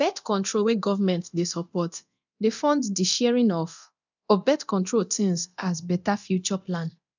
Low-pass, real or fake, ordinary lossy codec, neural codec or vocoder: 7.2 kHz; fake; none; codec, 24 kHz, 0.9 kbps, DualCodec